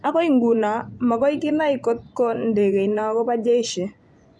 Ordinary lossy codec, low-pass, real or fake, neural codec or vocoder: none; none; fake; vocoder, 24 kHz, 100 mel bands, Vocos